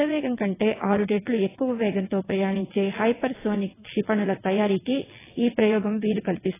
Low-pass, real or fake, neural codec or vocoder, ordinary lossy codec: 3.6 kHz; fake; vocoder, 22.05 kHz, 80 mel bands, WaveNeXt; AAC, 16 kbps